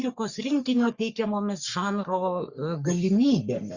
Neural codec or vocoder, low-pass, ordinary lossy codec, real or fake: codec, 44.1 kHz, 3.4 kbps, Pupu-Codec; 7.2 kHz; Opus, 64 kbps; fake